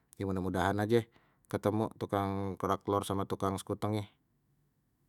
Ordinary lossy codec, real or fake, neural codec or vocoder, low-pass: none; fake; autoencoder, 48 kHz, 128 numbers a frame, DAC-VAE, trained on Japanese speech; 19.8 kHz